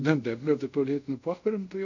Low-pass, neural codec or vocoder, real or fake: 7.2 kHz; codec, 24 kHz, 0.5 kbps, DualCodec; fake